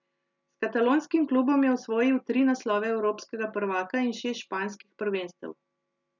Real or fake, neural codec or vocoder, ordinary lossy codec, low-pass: real; none; none; 7.2 kHz